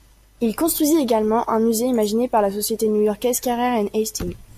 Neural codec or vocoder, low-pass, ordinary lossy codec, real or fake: none; 14.4 kHz; MP3, 96 kbps; real